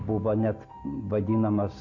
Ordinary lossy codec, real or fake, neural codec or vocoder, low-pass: MP3, 48 kbps; real; none; 7.2 kHz